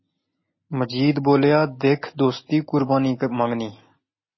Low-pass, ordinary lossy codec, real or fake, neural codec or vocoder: 7.2 kHz; MP3, 24 kbps; real; none